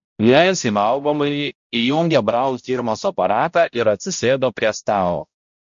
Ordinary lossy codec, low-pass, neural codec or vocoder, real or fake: MP3, 48 kbps; 7.2 kHz; codec, 16 kHz, 0.5 kbps, X-Codec, HuBERT features, trained on balanced general audio; fake